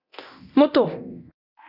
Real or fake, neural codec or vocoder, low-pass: fake; codec, 24 kHz, 0.9 kbps, DualCodec; 5.4 kHz